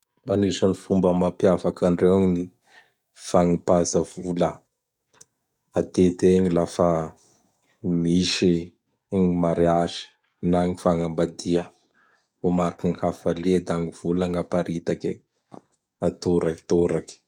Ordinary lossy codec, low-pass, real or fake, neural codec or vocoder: none; 19.8 kHz; fake; codec, 44.1 kHz, 7.8 kbps, DAC